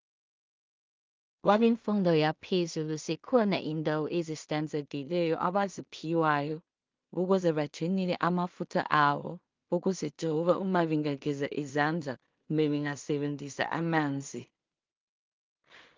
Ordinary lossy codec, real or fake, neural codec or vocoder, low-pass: Opus, 24 kbps; fake; codec, 16 kHz in and 24 kHz out, 0.4 kbps, LongCat-Audio-Codec, two codebook decoder; 7.2 kHz